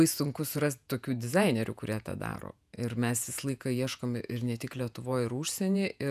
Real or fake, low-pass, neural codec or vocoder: fake; 14.4 kHz; vocoder, 48 kHz, 128 mel bands, Vocos